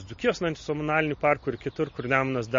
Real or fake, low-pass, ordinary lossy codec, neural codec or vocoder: real; 7.2 kHz; MP3, 32 kbps; none